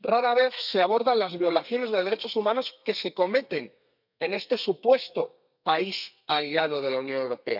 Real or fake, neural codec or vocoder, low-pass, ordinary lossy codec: fake; codec, 32 kHz, 1.9 kbps, SNAC; 5.4 kHz; none